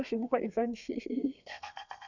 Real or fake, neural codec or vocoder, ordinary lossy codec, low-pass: fake; codec, 32 kHz, 1.9 kbps, SNAC; none; 7.2 kHz